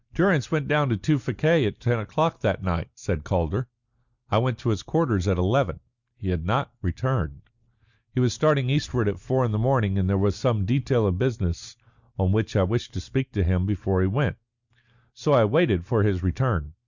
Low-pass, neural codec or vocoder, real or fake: 7.2 kHz; none; real